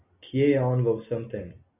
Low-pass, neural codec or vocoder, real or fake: 3.6 kHz; none; real